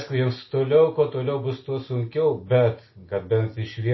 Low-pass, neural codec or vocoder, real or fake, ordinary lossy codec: 7.2 kHz; none; real; MP3, 24 kbps